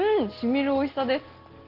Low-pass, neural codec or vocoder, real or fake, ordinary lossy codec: 5.4 kHz; none; real; Opus, 16 kbps